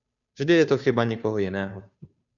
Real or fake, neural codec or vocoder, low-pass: fake; codec, 16 kHz, 2 kbps, FunCodec, trained on Chinese and English, 25 frames a second; 7.2 kHz